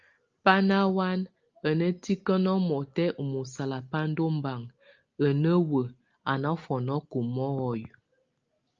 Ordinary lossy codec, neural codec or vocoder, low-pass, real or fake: Opus, 24 kbps; none; 7.2 kHz; real